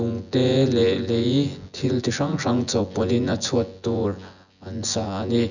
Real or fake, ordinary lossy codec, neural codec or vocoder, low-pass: fake; none; vocoder, 24 kHz, 100 mel bands, Vocos; 7.2 kHz